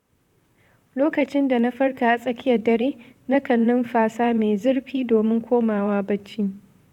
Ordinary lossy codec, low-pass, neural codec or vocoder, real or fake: none; 19.8 kHz; vocoder, 44.1 kHz, 128 mel bands, Pupu-Vocoder; fake